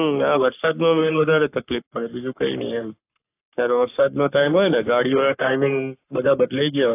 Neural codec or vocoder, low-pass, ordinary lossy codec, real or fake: codec, 44.1 kHz, 3.4 kbps, Pupu-Codec; 3.6 kHz; AAC, 24 kbps; fake